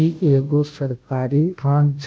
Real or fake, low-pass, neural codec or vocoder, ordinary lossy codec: fake; none; codec, 16 kHz, 0.5 kbps, FunCodec, trained on Chinese and English, 25 frames a second; none